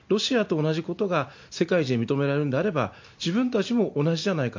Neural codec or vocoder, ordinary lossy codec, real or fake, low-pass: none; MP3, 48 kbps; real; 7.2 kHz